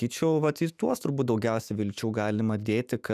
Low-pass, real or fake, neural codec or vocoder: 14.4 kHz; fake; autoencoder, 48 kHz, 128 numbers a frame, DAC-VAE, trained on Japanese speech